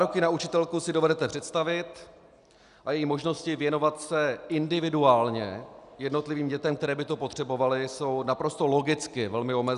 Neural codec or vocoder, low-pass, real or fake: none; 10.8 kHz; real